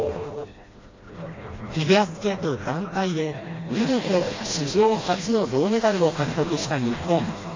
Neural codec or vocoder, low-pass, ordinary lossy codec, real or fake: codec, 16 kHz, 1 kbps, FreqCodec, smaller model; 7.2 kHz; AAC, 32 kbps; fake